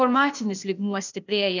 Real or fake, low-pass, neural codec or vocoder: fake; 7.2 kHz; codec, 16 kHz, 0.8 kbps, ZipCodec